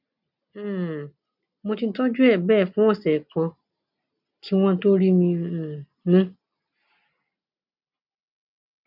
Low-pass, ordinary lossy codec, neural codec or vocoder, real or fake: 5.4 kHz; none; none; real